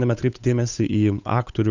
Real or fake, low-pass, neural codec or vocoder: fake; 7.2 kHz; codec, 16 kHz, 8 kbps, FunCodec, trained on Chinese and English, 25 frames a second